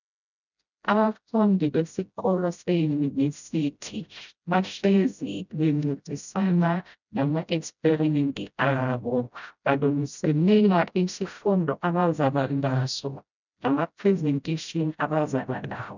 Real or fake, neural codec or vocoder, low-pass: fake; codec, 16 kHz, 0.5 kbps, FreqCodec, smaller model; 7.2 kHz